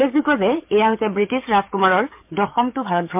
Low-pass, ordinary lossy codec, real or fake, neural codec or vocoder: 3.6 kHz; MP3, 32 kbps; fake; codec, 44.1 kHz, 7.8 kbps, DAC